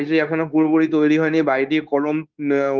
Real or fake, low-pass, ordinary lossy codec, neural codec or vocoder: fake; 7.2 kHz; Opus, 24 kbps; codec, 16 kHz, 0.9 kbps, LongCat-Audio-Codec